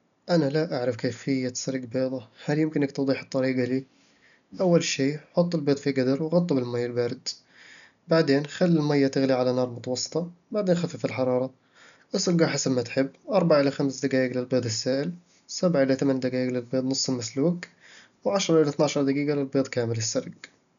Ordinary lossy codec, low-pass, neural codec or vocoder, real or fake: none; 7.2 kHz; none; real